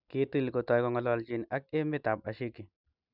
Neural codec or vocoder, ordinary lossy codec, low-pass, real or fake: none; none; 5.4 kHz; real